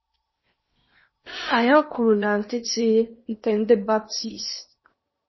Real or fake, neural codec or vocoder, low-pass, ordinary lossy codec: fake; codec, 16 kHz in and 24 kHz out, 0.8 kbps, FocalCodec, streaming, 65536 codes; 7.2 kHz; MP3, 24 kbps